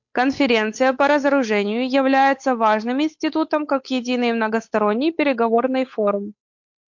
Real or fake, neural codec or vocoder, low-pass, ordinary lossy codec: fake; codec, 16 kHz, 8 kbps, FunCodec, trained on Chinese and English, 25 frames a second; 7.2 kHz; MP3, 48 kbps